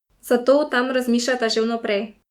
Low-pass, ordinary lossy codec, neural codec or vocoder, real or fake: 19.8 kHz; Opus, 64 kbps; autoencoder, 48 kHz, 128 numbers a frame, DAC-VAE, trained on Japanese speech; fake